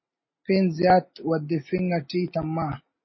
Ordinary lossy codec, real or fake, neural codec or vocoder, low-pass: MP3, 24 kbps; real; none; 7.2 kHz